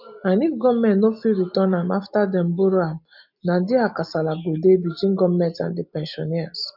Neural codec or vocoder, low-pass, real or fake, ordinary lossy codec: none; 5.4 kHz; real; none